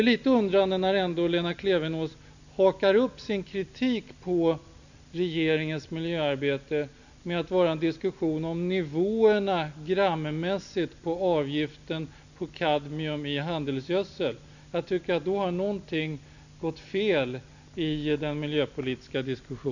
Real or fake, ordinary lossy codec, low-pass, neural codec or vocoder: real; none; 7.2 kHz; none